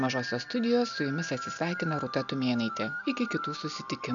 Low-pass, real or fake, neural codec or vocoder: 7.2 kHz; real; none